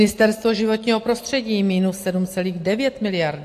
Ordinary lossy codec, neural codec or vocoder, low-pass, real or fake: AAC, 64 kbps; none; 14.4 kHz; real